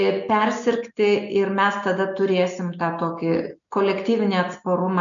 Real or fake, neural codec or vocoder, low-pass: real; none; 7.2 kHz